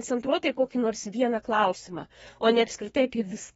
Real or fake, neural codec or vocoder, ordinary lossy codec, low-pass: fake; codec, 32 kHz, 1.9 kbps, SNAC; AAC, 24 kbps; 14.4 kHz